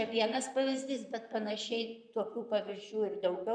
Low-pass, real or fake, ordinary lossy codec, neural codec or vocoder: 9.9 kHz; fake; MP3, 96 kbps; vocoder, 44.1 kHz, 128 mel bands, Pupu-Vocoder